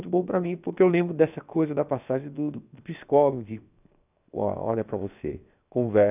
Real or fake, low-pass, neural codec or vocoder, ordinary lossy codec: fake; 3.6 kHz; codec, 16 kHz, 0.7 kbps, FocalCodec; none